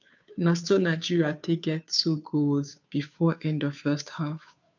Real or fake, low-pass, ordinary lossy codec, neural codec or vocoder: fake; 7.2 kHz; none; codec, 16 kHz, 2 kbps, FunCodec, trained on Chinese and English, 25 frames a second